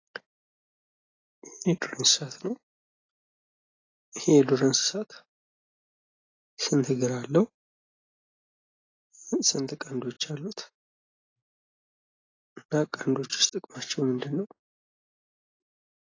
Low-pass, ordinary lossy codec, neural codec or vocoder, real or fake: 7.2 kHz; AAC, 32 kbps; none; real